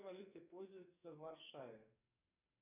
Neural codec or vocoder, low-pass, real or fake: codec, 44.1 kHz, 2.6 kbps, SNAC; 3.6 kHz; fake